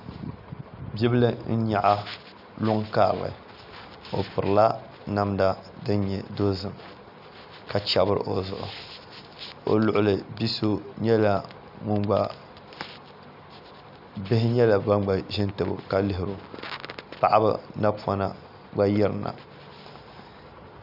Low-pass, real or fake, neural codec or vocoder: 5.4 kHz; real; none